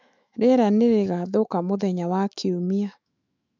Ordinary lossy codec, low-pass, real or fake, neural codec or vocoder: none; 7.2 kHz; fake; autoencoder, 48 kHz, 128 numbers a frame, DAC-VAE, trained on Japanese speech